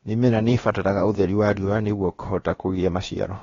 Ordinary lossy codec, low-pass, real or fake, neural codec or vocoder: AAC, 32 kbps; 7.2 kHz; fake; codec, 16 kHz, about 1 kbps, DyCAST, with the encoder's durations